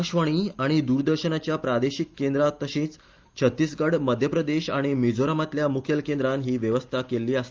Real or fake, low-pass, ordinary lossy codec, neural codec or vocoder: real; 7.2 kHz; Opus, 32 kbps; none